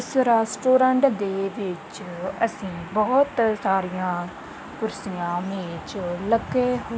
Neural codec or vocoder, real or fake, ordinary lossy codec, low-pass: none; real; none; none